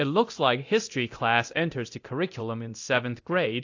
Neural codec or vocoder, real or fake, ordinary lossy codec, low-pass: codec, 24 kHz, 0.9 kbps, DualCodec; fake; AAC, 48 kbps; 7.2 kHz